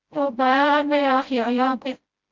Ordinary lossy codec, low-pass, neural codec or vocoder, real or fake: Opus, 24 kbps; 7.2 kHz; codec, 16 kHz, 0.5 kbps, FreqCodec, smaller model; fake